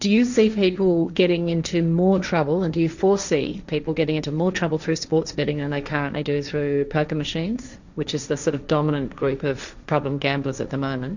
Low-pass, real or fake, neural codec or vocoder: 7.2 kHz; fake; codec, 16 kHz, 1.1 kbps, Voila-Tokenizer